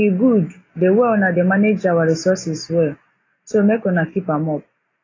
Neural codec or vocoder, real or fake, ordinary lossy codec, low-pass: none; real; AAC, 32 kbps; 7.2 kHz